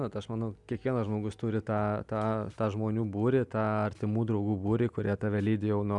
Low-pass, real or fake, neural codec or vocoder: 10.8 kHz; real; none